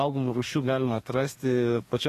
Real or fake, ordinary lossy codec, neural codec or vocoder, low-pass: fake; AAC, 48 kbps; codec, 32 kHz, 1.9 kbps, SNAC; 14.4 kHz